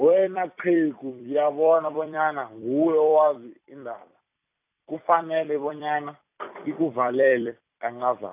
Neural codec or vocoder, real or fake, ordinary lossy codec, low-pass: vocoder, 44.1 kHz, 128 mel bands every 256 samples, BigVGAN v2; fake; AAC, 32 kbps; 3.6 kHz